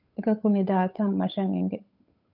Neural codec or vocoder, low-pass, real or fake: codec, 16 kHz, 8 kbps, FunCodec, trained on Chinese and English, 25 frames a second; 5.4 kHz; fake